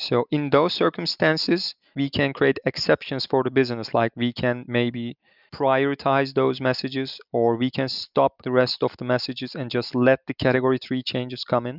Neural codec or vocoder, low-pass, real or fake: none; 5.4 kHz; real